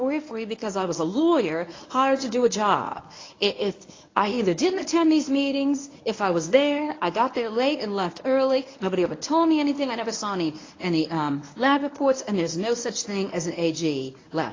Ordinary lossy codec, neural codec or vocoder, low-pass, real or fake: AAC, 32 kbps; codec, 24 kHz, 0.9 kbps, WavTokenizer, medium speech release version 1; 7.2 kHz; fake